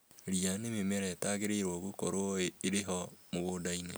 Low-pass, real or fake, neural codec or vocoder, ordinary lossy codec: none; real; none; none